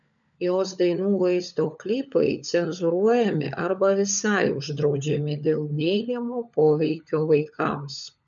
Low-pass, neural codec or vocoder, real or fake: 7.2 kHz; codec, 16 kHz, 16 kbps, FunCodec, trained on LibriTTS, 50 frames a second; fake